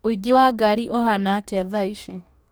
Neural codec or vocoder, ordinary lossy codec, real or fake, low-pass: codec, 44.1 kHz, 2.6 kbps, DAC; none; fake; none